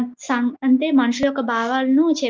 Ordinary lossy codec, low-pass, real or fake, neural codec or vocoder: Opus, 32 kbps; 7.2 kHz; real; none